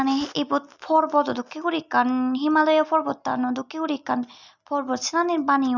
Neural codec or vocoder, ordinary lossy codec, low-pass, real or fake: none; none; 7.2 kHz; real